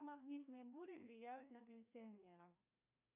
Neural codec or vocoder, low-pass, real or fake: codec, 16 kHz, 1 kbps, FreqCodec, larger model; 3.6 kHz; fake